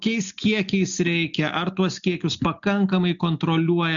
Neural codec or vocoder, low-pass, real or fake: none; 7.2 kHz; real